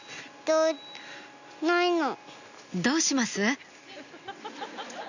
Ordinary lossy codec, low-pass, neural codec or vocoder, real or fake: none; 7.2 kHz; none; real